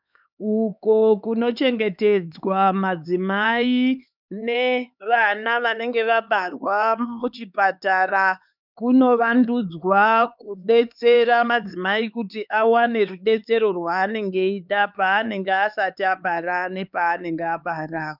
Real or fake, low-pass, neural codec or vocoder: fake; 5.4 kHz; codec, 16 kHz, 4 kbps, X-Codec, HuBERT features, trained on LibriSpeech